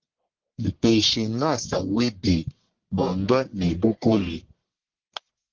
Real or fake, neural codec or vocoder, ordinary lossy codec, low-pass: fake; codec, 44.1 kHz, 1.7 kbps, Pupu-Codec; Opus, 16 kbps; 7.2 kHz